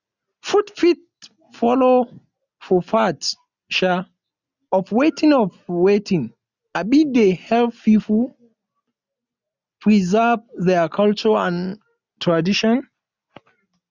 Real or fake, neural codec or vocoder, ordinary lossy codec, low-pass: real; none; none; 7.2 kHz